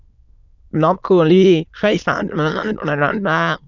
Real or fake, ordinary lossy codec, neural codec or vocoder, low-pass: fake; none; autoencoder, 22.05 kHz, a latent of 192 numbers a frame, VITS, trained on many speakers; 7.2 kHz